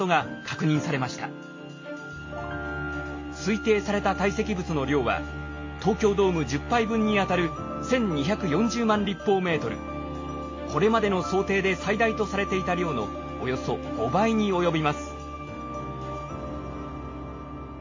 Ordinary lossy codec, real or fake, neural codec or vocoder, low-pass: MP3, 32 kbps; real; none; 7.2 kHz